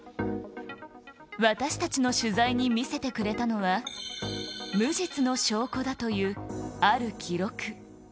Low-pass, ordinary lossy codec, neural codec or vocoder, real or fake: none; none; none; real